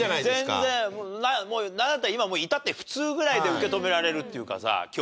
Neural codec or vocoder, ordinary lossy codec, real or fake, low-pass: none; none; real; none